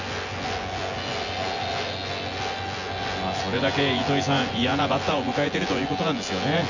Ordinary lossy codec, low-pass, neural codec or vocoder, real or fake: Opus, 64 kbps; 7.2 kHz; vocoder, 24 kHz, 100 mel bands, Vocos; fake